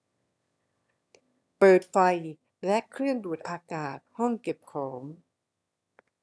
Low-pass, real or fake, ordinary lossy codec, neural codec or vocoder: none; fake; none; autoencoder, 22.05 kHz, a latent of 192 numbers a frame, VITS, trained on one speaker